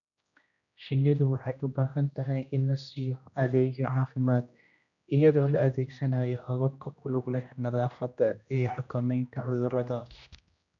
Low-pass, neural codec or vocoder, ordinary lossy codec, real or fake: 7.2 kHz; codec, 16 kHz, 1 kbps, X-Codec, HuBERT features, trained on general audio; none; fake